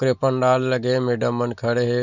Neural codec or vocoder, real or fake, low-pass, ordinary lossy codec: none; real; 7.2 kHz; Opus, 64 kbps